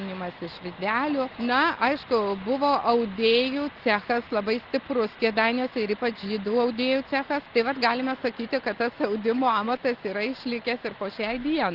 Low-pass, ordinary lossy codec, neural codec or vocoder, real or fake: 5.4 kHz; Opus, 16 kbps; none; real